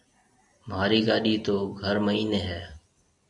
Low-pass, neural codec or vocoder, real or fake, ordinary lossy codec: 10.8 kHz; none; real; AAC, 64 kbps